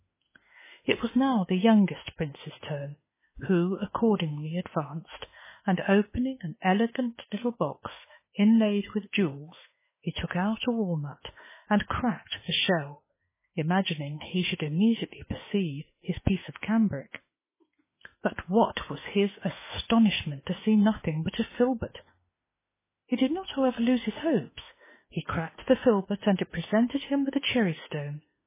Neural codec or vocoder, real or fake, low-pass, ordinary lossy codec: autoencoder, 48 kHz, 32 numbers a frame, DAC-VAE, trained on Japanese speech; fake; 3.6 kHz; MP3, 16 kbps